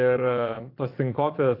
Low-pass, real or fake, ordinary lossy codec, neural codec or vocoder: 5.4 kHz; fake; AAC, 32 kbps; vocoder, 22.05 kHz, 80 mel bands, Vocos